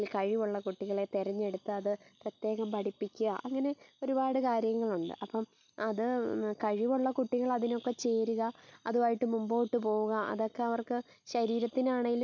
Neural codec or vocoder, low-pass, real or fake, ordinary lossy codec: none; 7.2 kHz; real; none